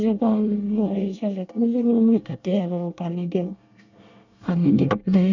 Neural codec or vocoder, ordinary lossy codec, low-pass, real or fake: codec, 24 kHz, 1 kbps, SNAC; none; 7.2 kHz; fake